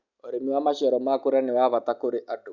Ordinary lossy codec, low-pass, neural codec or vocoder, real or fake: none; 7.2 kHz; none; real